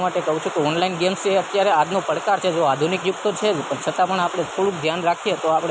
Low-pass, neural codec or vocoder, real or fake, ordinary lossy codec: none; none; real; none